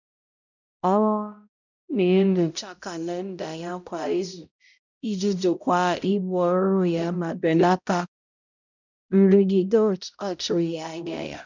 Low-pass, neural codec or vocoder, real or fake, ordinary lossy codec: 7.2 kHz; codec, 16 kHz, 0.5 kbps, X-Codec, HuBERT features, trained on balanced general audio; fake; none